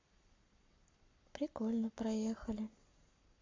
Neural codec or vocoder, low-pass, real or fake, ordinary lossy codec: codec, 44.1 kHz, 7.8 kbps, Pupu-Codec; 7.2 kHz; fake; MP3, 48 kbps